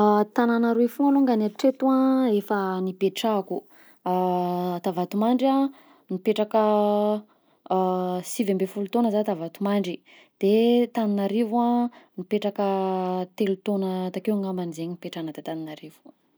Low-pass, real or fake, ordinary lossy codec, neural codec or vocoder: none; real; none; none